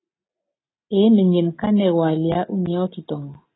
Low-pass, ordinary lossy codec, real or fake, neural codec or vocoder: 7.2 kHz; AAC, 16 kbps; real; none